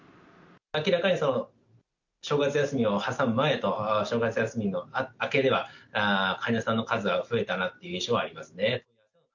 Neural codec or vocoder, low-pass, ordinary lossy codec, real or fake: none; 7.2 kHz; none; real